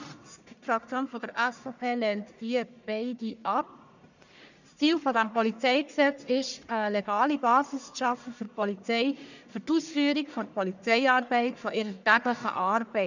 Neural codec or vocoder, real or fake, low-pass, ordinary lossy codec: codec, 44.1 kHz, 1.7 kbps, Pupu-Codec; fake; 7.2 kHz; none